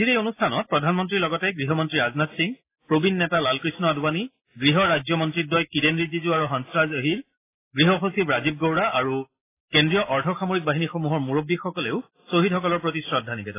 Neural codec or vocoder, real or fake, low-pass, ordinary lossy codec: none; real; 3.6 kHz; AAC, 24 kbps